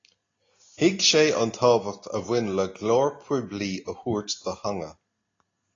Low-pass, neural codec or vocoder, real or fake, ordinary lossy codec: 7.2 kHz; none; real; AAC, 32 kbps